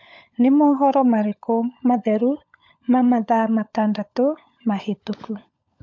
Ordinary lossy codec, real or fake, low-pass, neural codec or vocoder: MP3, 48 kbps; fake; 7.2 kHz; codec, 16 kHz, 16 kbps, FunCodec, trained on LibriTTS, 50 frames a second